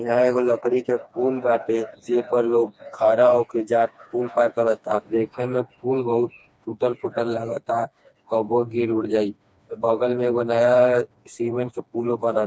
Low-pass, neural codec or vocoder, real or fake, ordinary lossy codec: none; codec, 16 kHz, 2 kbps, FreqCodec, smaller model; fake; none